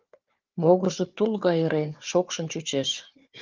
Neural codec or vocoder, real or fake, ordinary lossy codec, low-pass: codec, 16 kHz, 4 kbps, FunCodec, trained on Chinese and English, 50 frames a second; fake; Opus, 32 kbps; 7.2 kHz